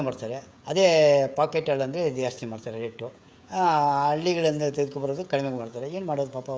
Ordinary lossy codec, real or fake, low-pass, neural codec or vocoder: none; fake; none; codec, 16 kHz, 16 kbps, FreqCodec, smaller model